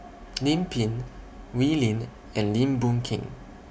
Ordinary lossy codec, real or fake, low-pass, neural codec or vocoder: none; real; none; none